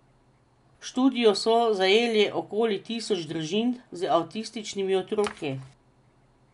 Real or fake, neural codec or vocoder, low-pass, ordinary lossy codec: real; none; 10.8 kHz; none